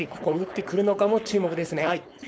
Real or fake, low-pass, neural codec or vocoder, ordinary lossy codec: fake; none; codec, 16 kHz, 4.8 kbps, FACodec; none